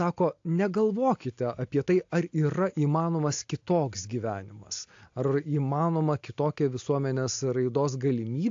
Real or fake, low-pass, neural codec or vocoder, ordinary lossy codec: real; 7.2 kHz; none; AAC, 48 kbps